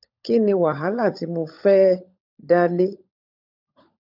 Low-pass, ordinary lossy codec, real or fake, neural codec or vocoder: 5.4 kHz; none; fake; codec, 16 kHz, 16 kbps, FunCodec, trained on LibriTTS, 50 frames a second